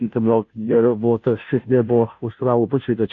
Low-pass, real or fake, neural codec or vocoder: 7.2 kHz; fake; codec, 16 kHz, 0.5 kbps, FunCodec, trained on Chinese and English, 25 frames a second